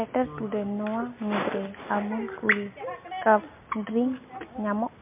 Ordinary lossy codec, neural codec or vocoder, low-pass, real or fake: MP3, 32 kbps; none; 3.6 kHz; real